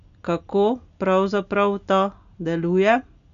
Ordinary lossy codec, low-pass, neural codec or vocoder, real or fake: none; 7.2 kHz; none; real